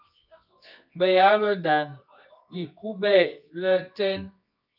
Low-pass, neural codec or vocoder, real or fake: 5.4 kHz; codec, 24 kHz, 0.9 kbps, WavTokenizer, medium music audio release; fake